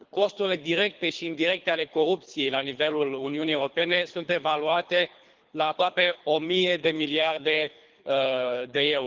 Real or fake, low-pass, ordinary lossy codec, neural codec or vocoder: fake; 7.2 kHz; Opus, 32 kbps; codec, 24 kHz, 3 kbps, HILCodec